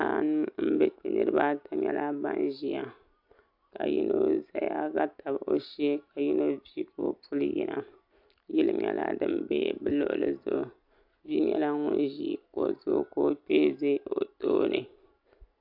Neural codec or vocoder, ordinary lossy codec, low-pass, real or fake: none; AAC, 48 kbps; 5.4 kHz; real